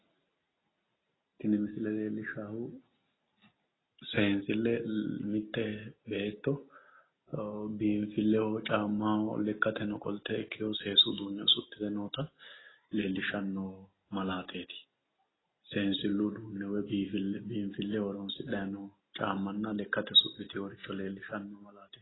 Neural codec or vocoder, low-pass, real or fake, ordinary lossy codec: none; 7.2 kHz; real; AAC, 16 kbps